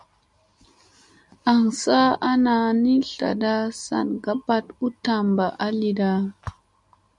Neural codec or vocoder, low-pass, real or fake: none; 10.8 kHz; real